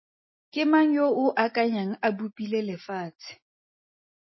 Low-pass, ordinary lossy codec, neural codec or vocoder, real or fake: 7.2 kHz; MP3, 24 kbps; none; real